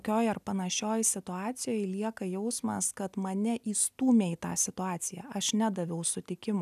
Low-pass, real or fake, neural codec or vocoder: 14.4 kHz; real; none